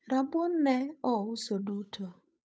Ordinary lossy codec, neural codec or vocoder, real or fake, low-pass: none; codec, 16 kHz, 8 kbps, FunCodec, trained on Chinese and English, 25 frames a second; fake; none